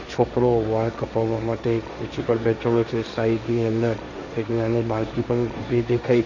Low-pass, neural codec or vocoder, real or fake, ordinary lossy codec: 7.2 kHz; codec, 16 kHz, 1.1 kbps, Voila-Tokenizer; fake; none